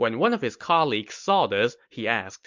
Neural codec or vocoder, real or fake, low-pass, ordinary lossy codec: none; real; 7.2 kHz; MP3, 64 kbps